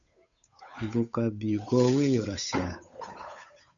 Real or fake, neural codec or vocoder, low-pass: fake; codec, 16 kHz, 8 kbps, FunCodec, trained on Chinese and English, 25 frames a second; 7.2 kHz